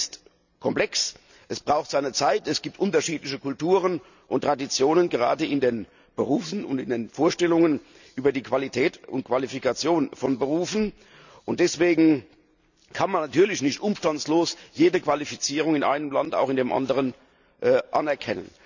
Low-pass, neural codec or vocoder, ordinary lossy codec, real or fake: 7.2 kHz; none; none; real